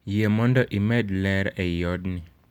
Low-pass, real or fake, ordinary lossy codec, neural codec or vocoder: 19.8 kHz; real; none; none